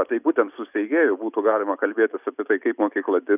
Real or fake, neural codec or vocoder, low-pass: real; none; 3.6 kHz